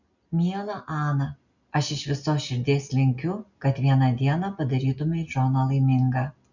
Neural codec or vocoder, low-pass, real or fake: none; 7.2 kHz; real